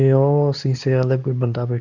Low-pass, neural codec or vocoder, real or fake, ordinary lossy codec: 7.2 kHz; codec, 24 kHz, 0.9 kbps, WavTokenizer, medium speech release version 2; fake; none